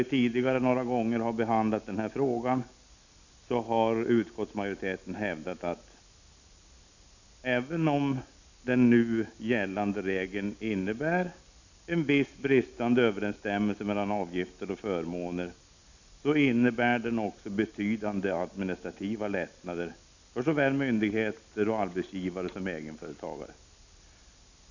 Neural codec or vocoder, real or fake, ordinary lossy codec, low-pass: none; real; none; 7.2 kHz